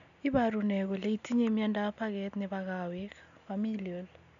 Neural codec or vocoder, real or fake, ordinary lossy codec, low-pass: none; real; none; 7.2 kHz